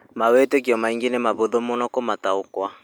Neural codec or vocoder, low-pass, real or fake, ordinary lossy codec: none; none; real; none